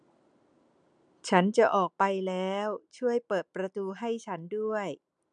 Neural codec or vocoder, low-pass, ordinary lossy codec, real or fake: none; 9.9 kHz; none; real